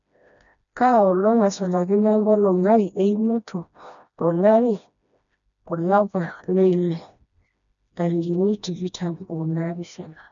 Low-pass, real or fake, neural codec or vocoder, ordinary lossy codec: 7.2 kHz; fake; codec, 16 kHz, 1 kbps, FreqCodec, smaller model; none